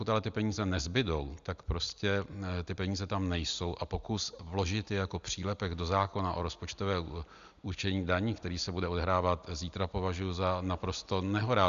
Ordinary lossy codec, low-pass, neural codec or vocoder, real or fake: Opus, 64 kbps; 7.2 kHz; none; real